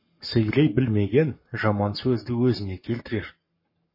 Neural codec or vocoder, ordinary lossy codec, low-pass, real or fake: codec, 44.1 kHz, 7.8 kbps, Pupu-Codec; MP3, 24 kbps; 5.4 kHz; fake